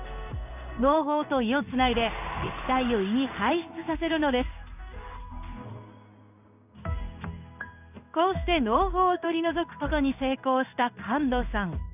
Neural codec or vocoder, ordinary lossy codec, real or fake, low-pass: codec, 16 kHz in and 24 kHz out, 1 kbps, XY-Tokenizer; none; fake; 3.6 kHz